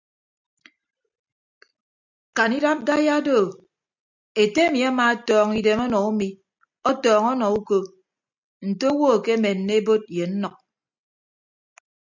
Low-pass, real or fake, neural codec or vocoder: 7.2 kHz; real; none